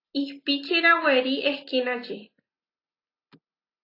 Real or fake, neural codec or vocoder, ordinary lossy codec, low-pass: real; none; AAC, 32 kbps; 5.4 kHz